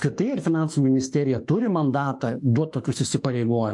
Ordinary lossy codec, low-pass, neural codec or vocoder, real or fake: AAC, 64 kbps; 10.8 kHz; autoencoder, 48 kHz, 32 numbers a frame, DAC-VAE, trained on Japanese speech; fake